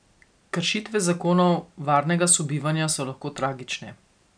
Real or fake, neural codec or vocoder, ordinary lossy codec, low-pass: real; none; none; 9.9 kHz